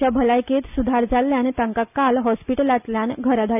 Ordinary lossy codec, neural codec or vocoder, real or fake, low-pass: none; none; real; 3.6 kHz